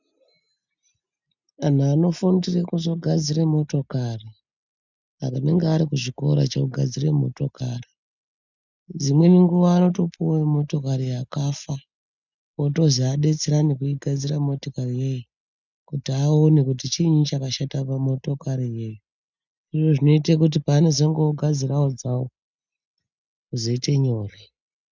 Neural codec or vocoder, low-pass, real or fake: none; 7.2 kHz; real